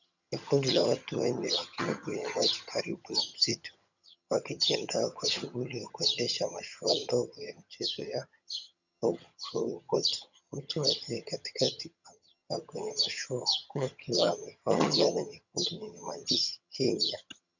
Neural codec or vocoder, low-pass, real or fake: vocoder, 22.05 kHz, 80 mel bands, HiFi-GAN; 7.2 kHz; fake